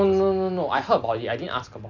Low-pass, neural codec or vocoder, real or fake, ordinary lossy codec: 7.2 kHz; vocoder, 44.1 kHz, 128 mel bands every 256 samples, BigVGAN v2; fake; none